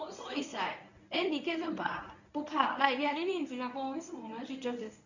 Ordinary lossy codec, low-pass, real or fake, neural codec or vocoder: none; 7.2 kHz; fake; codec, 24 kHz, 0.9 kbps, WavTokenizer, medium speech release version 1